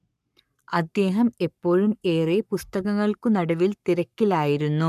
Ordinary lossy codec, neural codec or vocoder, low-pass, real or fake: none; codec, 44.1 kHz, 7.8 kbps, Pupu-Codec; 14.4 kHz; fake